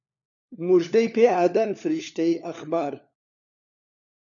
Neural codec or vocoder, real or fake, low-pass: codec, 16 kHz, 4 kbps, FunCodec, trained on LibriTTS, 50 frames a second; fake; 7.2 kHz